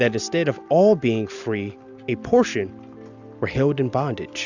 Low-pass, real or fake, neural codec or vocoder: 7.2 kHz; real; none